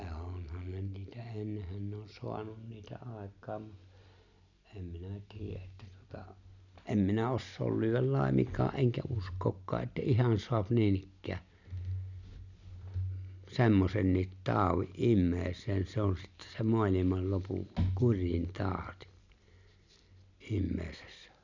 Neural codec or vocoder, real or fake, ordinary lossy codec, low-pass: none; real; none; 7.2 kHz